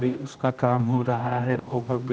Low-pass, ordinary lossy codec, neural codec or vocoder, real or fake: none; none; codec, 16 kHz, 1 kbps, X-Codec, HuBERT features, trained on general audio; fake